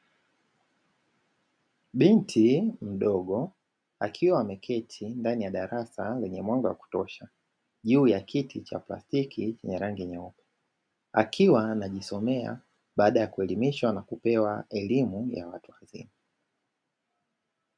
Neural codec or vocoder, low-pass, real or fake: none; 9.9 kHz; real